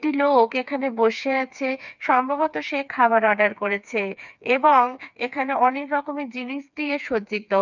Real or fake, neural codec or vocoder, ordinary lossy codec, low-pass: fake; codec, 16 kHz, 4 kbps, FreqCodec, smaller model; none; 7.2 kHz